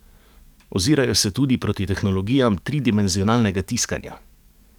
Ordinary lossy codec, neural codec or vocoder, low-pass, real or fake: none; codec, 44.1 kHz, 7.8 kbps, DAC; 19.8 kHz; fake